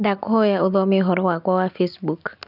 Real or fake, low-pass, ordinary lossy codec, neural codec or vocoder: real; 5.4 kHz; none; none